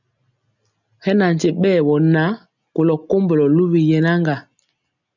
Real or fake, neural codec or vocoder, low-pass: real; none; 7.2 kHz